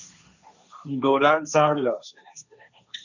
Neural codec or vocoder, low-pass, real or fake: codec, 16 kHz, 1.1 kbps, Voila-Tokenizer; 7.2 kHz; fake